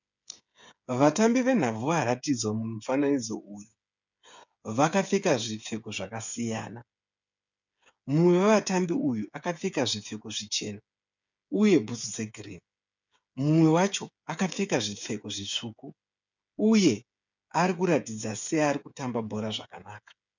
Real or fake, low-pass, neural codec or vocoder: fake; 7.2 kHz; codec, 16 kHz, 8 kbps, FreqCodec, smaller model